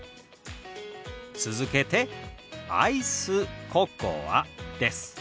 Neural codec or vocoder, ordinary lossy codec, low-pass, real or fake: none; none; none; real